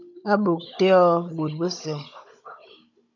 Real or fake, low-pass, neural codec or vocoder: fake; 7.2 kHz; codec, 16 kHz, 16 kbps, FunCodec, trained on Chinese and English, 50 frames a second